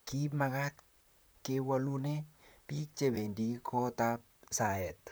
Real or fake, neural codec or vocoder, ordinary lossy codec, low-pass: fake; vocoder, 44.1 kHz, 128 mel bands, Pupu-Vocoder; none; none